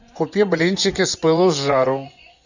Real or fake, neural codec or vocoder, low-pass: fake; vocoder, 24 kHz, 100 mel bands, Vocos; 7.2 kHz